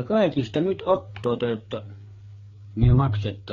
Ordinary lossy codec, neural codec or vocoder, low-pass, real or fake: AAC, 32 kbps; codec, 16 kHz, 4 kbps, FreqCodec, larger model; 7.2 kHz; fake